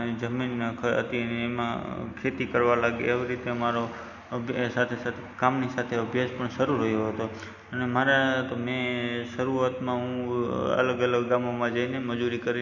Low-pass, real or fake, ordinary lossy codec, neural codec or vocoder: 7.2 kHz; real; none; none